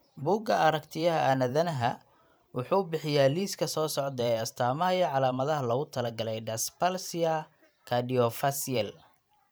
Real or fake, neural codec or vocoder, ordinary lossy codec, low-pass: fake; vocoder, 44.1 kHz, 128 mel bands every 512 samples, BigVGAN v2; none; none